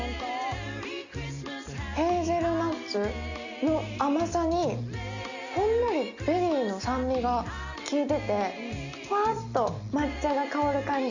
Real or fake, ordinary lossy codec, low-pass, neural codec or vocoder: real; Opus, 64 kbps; 7.2 kHz; none